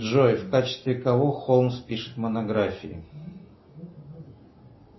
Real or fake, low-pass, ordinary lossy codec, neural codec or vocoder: real; 7.2 kHz; MP3, 24 kbps; none